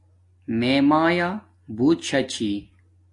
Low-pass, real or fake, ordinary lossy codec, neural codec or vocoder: 10.8 kHz; real; AAC, 48 kbps; none